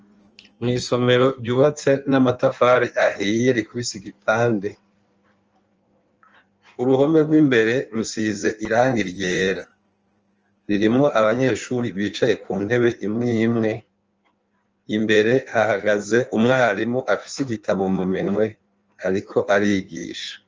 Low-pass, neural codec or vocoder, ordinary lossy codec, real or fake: 7.2 kHz; codec, 16 kHz in and 24 kHz out, 1.1 kbps, FireRedTTS-2 codec; Opus, 24 kbps; fake